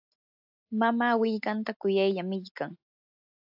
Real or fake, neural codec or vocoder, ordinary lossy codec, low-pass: real; none; AAC, 48 kbps; 5.4 kHz